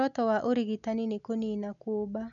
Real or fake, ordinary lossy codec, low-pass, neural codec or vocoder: real; none; 7.2 kHz; none